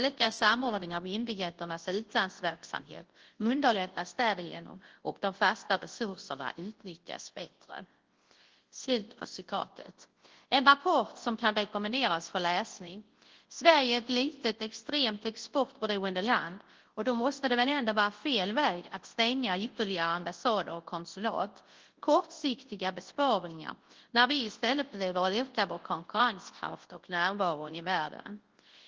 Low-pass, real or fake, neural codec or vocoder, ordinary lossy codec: 7.2 kHz; fake; codec, 24 kHz, 0.9 kbps, WavTokenizer, large speech release; Opus, 16 kbps